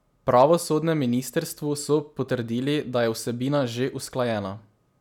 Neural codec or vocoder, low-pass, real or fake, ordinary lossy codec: none; 19.8 kHz; real; none